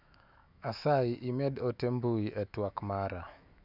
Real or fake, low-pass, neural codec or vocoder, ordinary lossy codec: fake; 5.4 kHz; autoencoder, 48 kHz, 128 numbers a frame, DAC-VAE, trained on Japanese speech; none